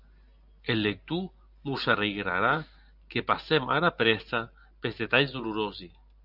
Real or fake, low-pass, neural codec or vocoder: real; 5.4 kHz; none